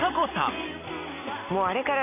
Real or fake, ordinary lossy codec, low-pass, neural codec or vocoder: real; none; 3.6 kHz; none